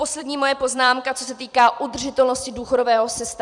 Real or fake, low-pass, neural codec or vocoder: fake; 10.8 kHz; vocoder, 44.1 kHz, 128 mel bands every 256 samples, BigVGAN v2